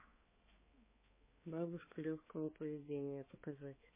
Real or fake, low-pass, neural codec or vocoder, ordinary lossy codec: fake; 3.6 kHz; codec, 16 kHz, 4 kbps, X-Codec, HuBERT features, trained on balanced general audio; MP3, 16 kbps